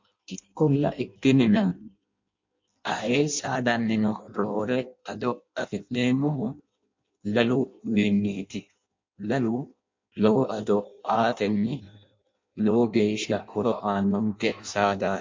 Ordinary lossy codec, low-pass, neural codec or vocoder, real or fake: MP3, 48 kbps; 7.2 kHz; codec, 16 kHz in and 24 kHz out, 0.6 kbps, FireRedTTS-2 codec; fake